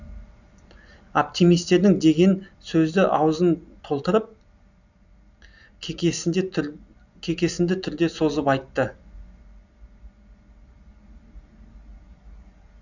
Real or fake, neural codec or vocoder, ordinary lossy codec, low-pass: real; none; none; 7.2 kHz